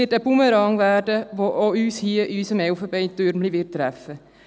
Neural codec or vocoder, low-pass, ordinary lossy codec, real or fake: none; none; none; real